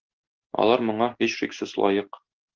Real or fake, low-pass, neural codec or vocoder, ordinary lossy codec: real; 7.2 kHz; none; Opus, 16 kbps